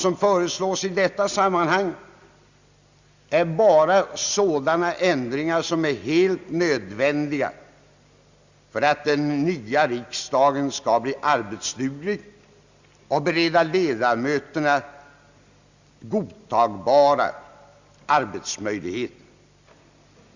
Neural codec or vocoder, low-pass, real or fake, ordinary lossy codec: none; 7.2 kHz; real; Opus, 64 kbps